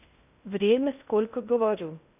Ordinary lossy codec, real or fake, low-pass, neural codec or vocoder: none; fake; 3.6 kHz; codec, 16 kHz in and 24 kHz out, 0.6 kbps, FocalCodec, streaming, 2048 codes